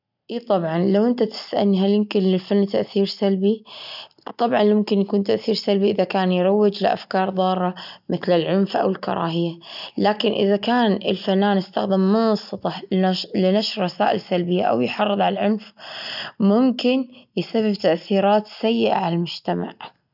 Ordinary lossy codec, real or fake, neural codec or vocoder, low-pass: none; real; none; 5.4 kHz